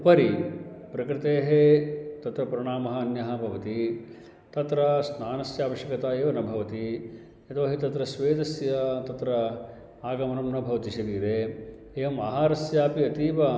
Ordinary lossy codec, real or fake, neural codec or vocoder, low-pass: none; real; none; none